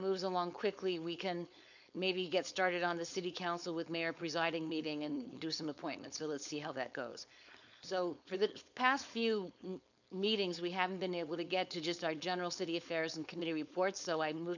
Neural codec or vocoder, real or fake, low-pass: codec, 16 kHz, 4.8 kbps, FACodec; fake; 7.2 kHz